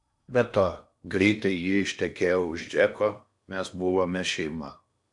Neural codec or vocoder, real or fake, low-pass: codec, 16 kHz in and 24 kHz out, 0.8 kbps, FocalCodec, streaming, 65536 codes; fake; 10.8 kHz